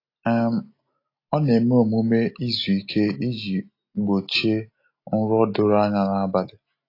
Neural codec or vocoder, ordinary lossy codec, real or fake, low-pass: none; AAC, 32 kbps; real; 5.4 kHz